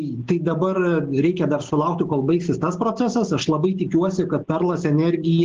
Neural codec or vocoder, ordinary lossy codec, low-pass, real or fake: none; Opus, 16 kbps; 7.2 kHz; real